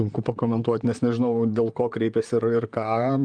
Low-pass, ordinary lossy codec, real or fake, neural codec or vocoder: 9.9 kHz; Opus, 32 kbps; fake; vocoder, 44.1 kHz, 128 mel bands, Pupu-Vocoder